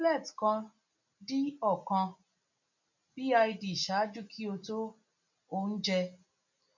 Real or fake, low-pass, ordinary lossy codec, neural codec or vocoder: real; 7.2 kHz; none; none